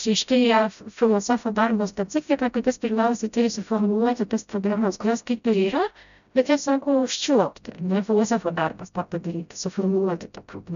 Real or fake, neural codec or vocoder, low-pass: fake; codec, 16 kHz, 0.5 kbps, FreqCodec, smaller model; 7.2 kHz